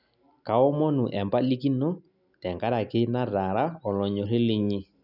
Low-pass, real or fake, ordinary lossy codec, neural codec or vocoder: 5.4 kHz; real; none; none